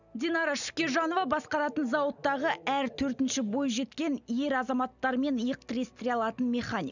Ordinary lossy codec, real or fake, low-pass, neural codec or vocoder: none; real; 7.2 kHz; none